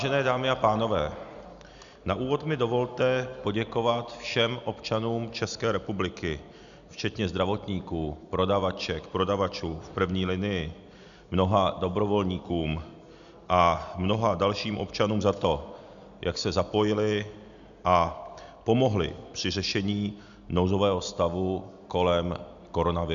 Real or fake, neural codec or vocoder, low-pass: real; none; 7.2 kHz